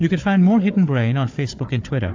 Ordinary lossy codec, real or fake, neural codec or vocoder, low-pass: AAC, 48 kbps; fake; codec, 16 kHz, 4 kbps, FunCodec, trained on Chinese and English, 50 frames a second; 7.2 kHz